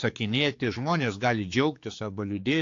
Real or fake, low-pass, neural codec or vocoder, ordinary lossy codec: fake; 7.2 kHz; codec, 16 kHz, 4 kbps, X-Codec, HuBERT features, trained on general audio; AAC, 48 kbps